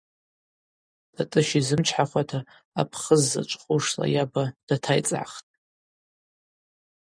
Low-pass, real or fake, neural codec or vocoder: 9.9 kHz; real; none